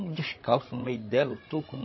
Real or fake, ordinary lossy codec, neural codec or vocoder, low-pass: fake; MP3, 24 kbps; codec, 16 kHz, 16 kbps, FreqCodec, larger model; 7.2 kHz